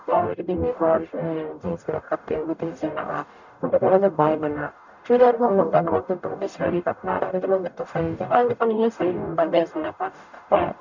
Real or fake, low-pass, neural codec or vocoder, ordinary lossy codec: fake; 7.2 kHz; codec, 44.1 kHz, 0.9 kbps, DAC; none